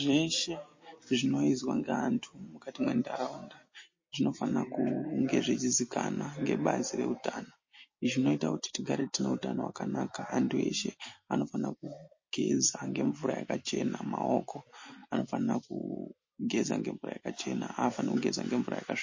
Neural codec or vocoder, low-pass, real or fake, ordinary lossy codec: none; 7.2 kHz; real; MP3, 32 kbps